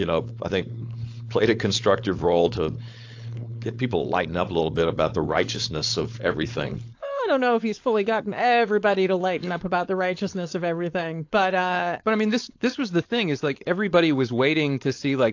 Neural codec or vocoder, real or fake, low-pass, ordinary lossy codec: codec, 16 kHz, 4.8 kbps, FACodec; fake; 7.2 kHz; AAC, 48 kbps